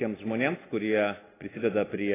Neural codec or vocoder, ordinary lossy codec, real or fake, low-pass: none; AAC, 16 kbps; real; 3.6 kHz